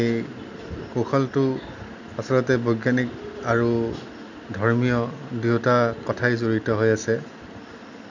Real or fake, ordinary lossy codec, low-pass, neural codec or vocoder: real; none; 7.2 kHz; none